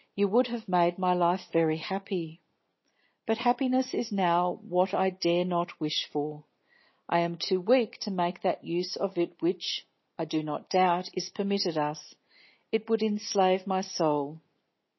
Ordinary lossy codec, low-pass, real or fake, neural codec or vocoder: MP3, 24 kbps; 7.2 kHz; real; none